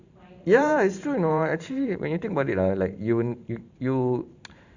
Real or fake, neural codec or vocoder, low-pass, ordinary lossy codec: fake; vocoder, 44.1 kHz, 128 mel bands every 512 samples, BigVGAN v2; 7.2 kHz; Opus, 64 kbps